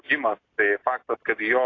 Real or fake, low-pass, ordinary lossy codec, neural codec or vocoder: real; 7.2 kHz; AAC, 32 kbps; none